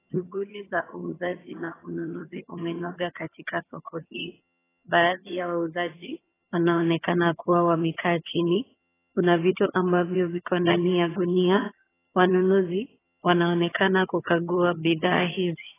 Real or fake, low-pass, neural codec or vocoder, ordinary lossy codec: fake; 3.6 kHz; vocoder, 22.05 kHz, 80 mel bands, HiFi-GAN; AAC, 16 kbps